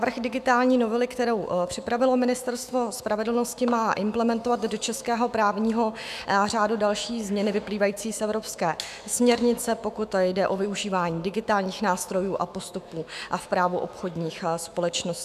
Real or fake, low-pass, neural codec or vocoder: fake; 14.4 kHz; autoencoder, 48 kHz, 128 numbers a frame, DAC-VAE, trained on Japanese speech